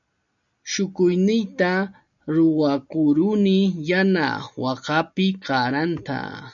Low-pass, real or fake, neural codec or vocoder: 7.2 kHz; real; none